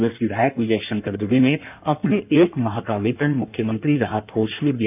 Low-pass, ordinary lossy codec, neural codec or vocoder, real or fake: 3.6 kHz; none; codec, 16 kHz in and 24 kHz out, 1.1 kbps, FireRedTTS-2 codec; fake